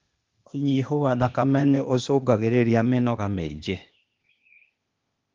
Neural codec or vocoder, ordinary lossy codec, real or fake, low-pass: codec, 16 kHz, 0.8 kbps, ZipCodec; Opus, 32 kbps; fake; 7.2 kHz